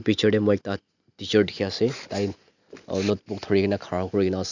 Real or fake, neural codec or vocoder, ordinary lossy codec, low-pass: real; none; none; 7.2 kHz